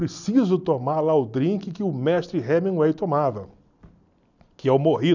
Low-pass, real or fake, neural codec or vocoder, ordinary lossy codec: 7.2 kHz; real; none; none